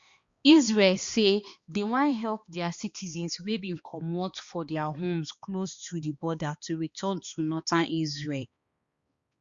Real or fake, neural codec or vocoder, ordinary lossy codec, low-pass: fake; codec, 16 kHz, 2 kbps, X-Codec, HuBERT features, trained on balanced general audio; Opus, 64 kbps; 7.2 kHz